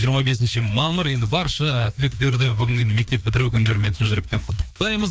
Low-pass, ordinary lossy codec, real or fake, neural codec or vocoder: none; none; fake; codec, 16 kHz, 2 kbps, FreqCodec, larger model